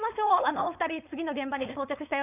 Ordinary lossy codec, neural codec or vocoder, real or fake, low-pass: none; codec, 16 kHz, 4.8 kbps, FACodec; fake; 3.6 kHz